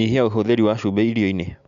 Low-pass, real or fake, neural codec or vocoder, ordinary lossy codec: 7.2 kHz; real; none; none